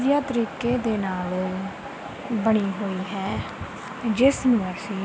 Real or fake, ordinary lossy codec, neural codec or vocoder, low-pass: real; none; none; none